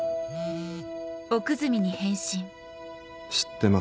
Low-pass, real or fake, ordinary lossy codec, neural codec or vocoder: none; real; none; none